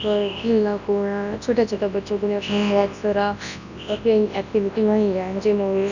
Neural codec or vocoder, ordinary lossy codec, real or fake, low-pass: codec, 24 kHz, 0.9 kbps, WavTokenizer, large speech release; none; fake; 7.2 kHz